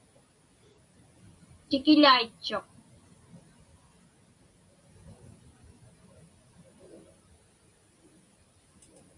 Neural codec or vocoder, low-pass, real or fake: none; 10.8 kHz; real